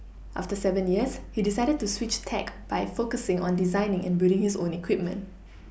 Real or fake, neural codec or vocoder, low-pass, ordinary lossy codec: real; none; none; none